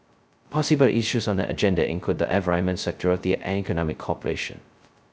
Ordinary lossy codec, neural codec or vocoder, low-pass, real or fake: none; codec, 16 kHz, 0.2 kbps, FocalCodec; none; fake